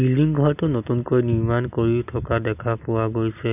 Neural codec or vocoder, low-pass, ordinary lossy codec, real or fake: none; 3.6 kHz; none; real